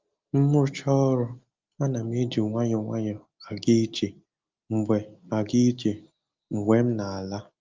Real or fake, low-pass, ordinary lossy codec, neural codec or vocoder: real; 7.2 kHz; Opus, 24 kbps; none